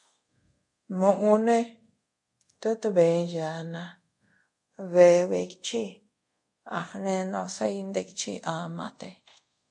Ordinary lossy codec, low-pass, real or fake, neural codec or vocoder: MP3, 48 kbps; 10.8 kHz; fake; codec, 24 kHz, 0.5 kbps, DualCodec